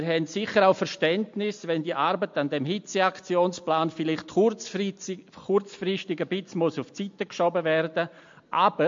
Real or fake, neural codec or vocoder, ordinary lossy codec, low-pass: real; none; MP3, 48 kbps; 7.2 kHz